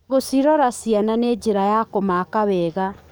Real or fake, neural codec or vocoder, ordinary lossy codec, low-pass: fake; codec, 44.1 kHz, 7.8 kbps, DAC; none; none